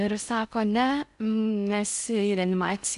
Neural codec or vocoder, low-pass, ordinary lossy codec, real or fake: codec, 16 kHz in and 24 kHz out, 0.6 kbps, FocalCodec, streaming, 4096 codes; 10.8 kHz; AAC, 96 kbps; fake